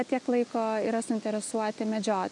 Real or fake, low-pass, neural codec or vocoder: real; 10.8 kHz; none